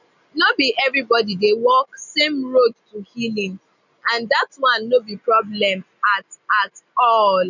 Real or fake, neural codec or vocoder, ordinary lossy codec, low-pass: real; none; none; 7.2 kHz